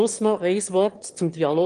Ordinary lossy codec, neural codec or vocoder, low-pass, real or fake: Opus, 24 kbps; autoencoder, 22.05 kHz, a latent of 192 numbers a frame, VITS, trained on one speaker; 9.9 kHz; fake